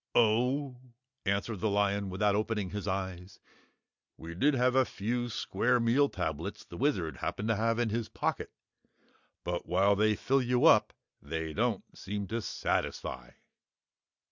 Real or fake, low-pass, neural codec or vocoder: real; 7.2 kHz; none